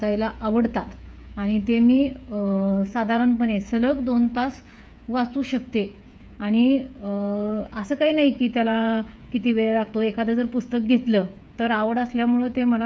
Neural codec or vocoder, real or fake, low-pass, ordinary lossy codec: codec, 16 kHz, 8 kbps, FreqCodec, smaller model; fake; none; none